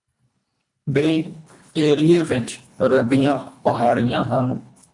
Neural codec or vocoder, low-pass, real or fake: codec, 24 kHz, 1.5 kbps, HILCodec; 10.8 kHz; fake